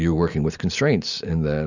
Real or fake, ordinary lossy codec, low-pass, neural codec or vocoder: real; Opus, 64 kbps; 7.2 kHz; none